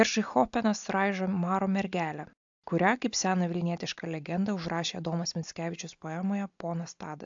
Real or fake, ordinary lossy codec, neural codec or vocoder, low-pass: real; AAC, 64 kbps; none; 7.2 kHz